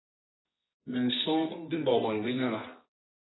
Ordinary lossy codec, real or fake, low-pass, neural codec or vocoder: AAC, 16 kbps; fake; 7.2 kHz; codec, 24 kHz, 0.9 kbps, WavTokenizer, medium music audio release